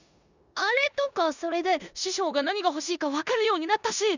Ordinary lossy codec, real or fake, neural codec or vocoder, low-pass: none; fake; codec, 16 kHz in and 24 kHz out, 0.9 kbps, LongCat-Audio-Codec, four codebook decoder; 7.2 kHz